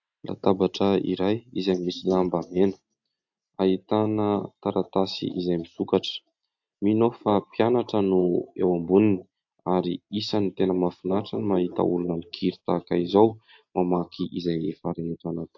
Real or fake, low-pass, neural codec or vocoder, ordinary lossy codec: real; 7.2 kHz; none; AAC, 48 kbps